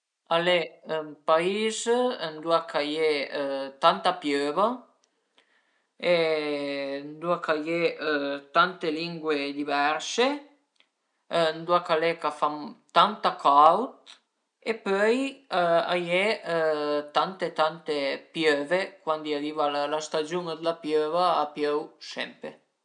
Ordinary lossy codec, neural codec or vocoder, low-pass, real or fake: none; none; 9.9 kHz; real